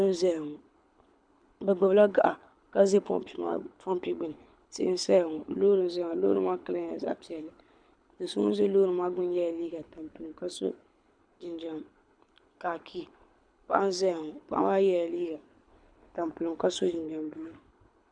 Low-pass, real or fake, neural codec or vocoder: 9.9 kHz; fake; codec, 24 kHz, 6 kbps, HILCodec